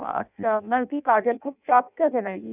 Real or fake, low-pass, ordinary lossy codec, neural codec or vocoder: fake; 3.6 kHz; none; codec, 16 kHz in and 24 kHz out, 0.6 kbps, FireRedTTS-2 codec